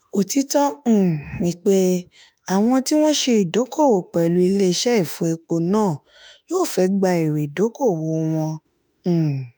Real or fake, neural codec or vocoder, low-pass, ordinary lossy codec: fake; autoencoder, 48 kHz, 32 numbers a frame, DAC-VAE, trained on Japanese speech; none; none